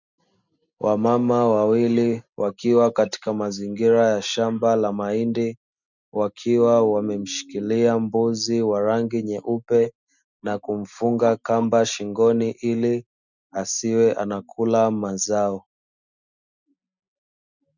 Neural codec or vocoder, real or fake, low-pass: none; real; 7.2 kHz